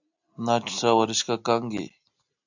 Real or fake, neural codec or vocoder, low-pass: real; none; 7.2 kHz